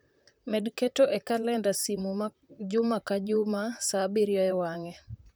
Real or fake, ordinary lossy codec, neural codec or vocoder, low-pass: fake; none; vocoder, 44.1 kHz, 128 mel bands, Pupu-Vocoder; none